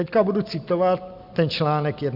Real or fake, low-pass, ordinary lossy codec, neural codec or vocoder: real; 5.4 kHz; AAC, 48 kbps; none